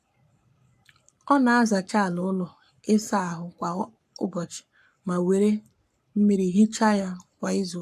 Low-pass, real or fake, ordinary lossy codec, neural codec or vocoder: 14.4 kHz; fake; AAC, 96 kbps; codec, 44.1 kHz, 7.8 kbps, Pupu-Codec